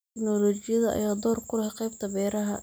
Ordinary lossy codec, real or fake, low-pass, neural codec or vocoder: none; real; none; none